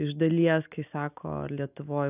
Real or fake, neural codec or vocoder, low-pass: real; none; 3.6 kHz